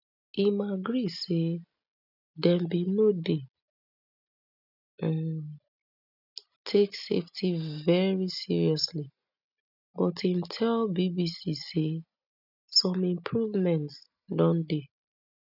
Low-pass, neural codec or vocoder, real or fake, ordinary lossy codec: 5.4 kHz; none; real; none